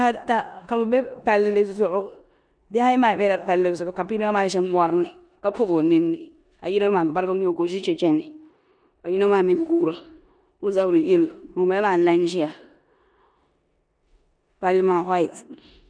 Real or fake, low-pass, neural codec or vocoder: fake; 9.9 kHz; codec, 16 kHz in and 24 kHz out, 0.9 kbps, LongCat-Audio-Codec, four codebook decoder